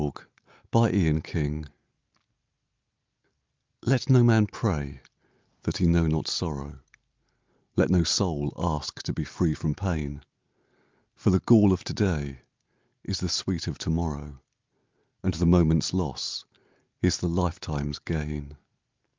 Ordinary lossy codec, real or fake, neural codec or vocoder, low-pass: Opus, 24 kbps; real; none; 7.2 kHz